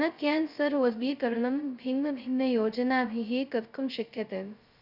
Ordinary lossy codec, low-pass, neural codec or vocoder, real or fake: Opus, 64 kbps; 5.4 kHz; codec, 16 kHz, 0.2 kbps, FocalCodec; fake